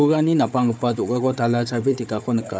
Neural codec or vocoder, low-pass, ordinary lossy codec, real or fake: codec, 16 kHz, 4 kbps, FunCodec, trained on Chinese and English, 50 frames a second; none; none; fake